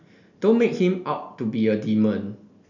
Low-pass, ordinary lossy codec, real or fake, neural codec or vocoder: 7.2 kHz; none; real; none